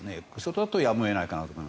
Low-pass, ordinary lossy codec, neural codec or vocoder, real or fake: none; none; none; real